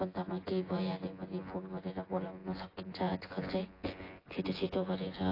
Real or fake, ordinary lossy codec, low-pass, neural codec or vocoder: fake; AAC, 24 kbps; 5.4 kHz; vocoder, 24 kHz, 100 mel bands, Vocos